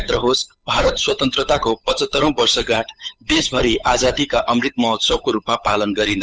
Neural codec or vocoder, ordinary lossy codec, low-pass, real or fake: codec, 16 kHz, 8 kbps, FunCodec, trained on Chinese and English, 25 frames a second; none; none; fake